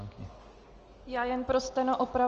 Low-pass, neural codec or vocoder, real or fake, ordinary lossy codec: 7.2 kHz; none; real; Opus, 24 kbps